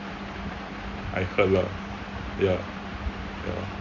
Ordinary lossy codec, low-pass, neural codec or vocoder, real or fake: Opus, 64 kbps; 7.2 kHz; none; real